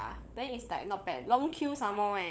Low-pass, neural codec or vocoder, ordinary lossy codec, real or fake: none; codec, 16 kHz, 16 kbps, FunCodec, trained on LibriTTS, 50 frames a second; none; fake